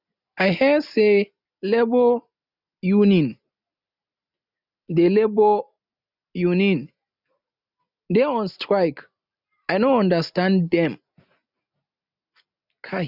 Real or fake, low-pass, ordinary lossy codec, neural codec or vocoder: real; 5.4 kHz; none; none